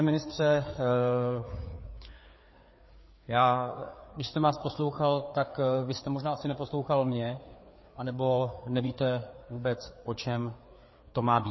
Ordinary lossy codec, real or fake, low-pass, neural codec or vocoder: MP3, 24 kbps; fake; 7.2 kHz; codec, 16 kHz, 4 kbps, FreqCodec, larger model